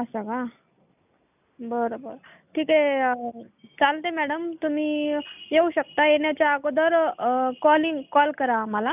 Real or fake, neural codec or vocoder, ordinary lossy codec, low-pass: real; none; none; 3.6 kHz